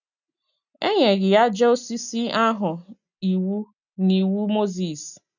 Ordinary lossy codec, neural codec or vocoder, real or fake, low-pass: none; none; real; 7.2 kHz